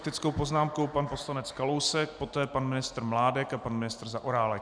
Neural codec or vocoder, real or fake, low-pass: none; real; 9.9 kHz